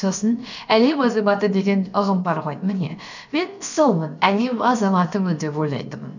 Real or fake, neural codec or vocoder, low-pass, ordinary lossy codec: fake; codec, 16 kHz, about 1 kbps, DyCAST, with the encoder's durations; 7.2 kHz; none